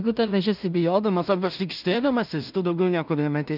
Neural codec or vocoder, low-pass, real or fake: codec, 16 kHz in and 24 kHz out, 0.4 kbps, LongCat-Audio-Codec, two codebook decoder; 5.4 kHz; fake